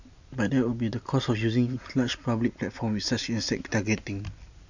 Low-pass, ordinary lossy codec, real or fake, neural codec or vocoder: 7.2 kHz; none; real; none